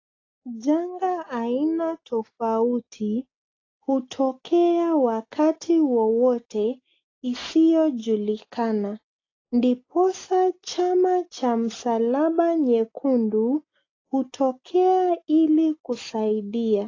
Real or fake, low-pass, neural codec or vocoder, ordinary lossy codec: real; 7.2 kHz; none; AAC, 32 kbps